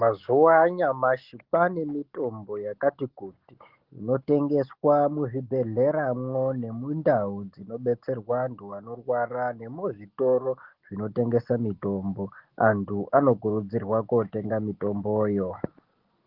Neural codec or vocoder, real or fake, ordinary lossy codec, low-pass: none; real; Opus, 16 kbps; 5.4 kHz